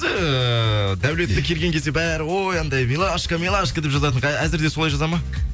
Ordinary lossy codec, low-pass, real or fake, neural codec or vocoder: none; none; real; none